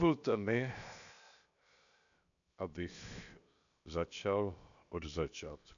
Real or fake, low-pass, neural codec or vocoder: fake; 7.2 kHz; codec, 16 kHz, 0.7 kbps, FocalCodec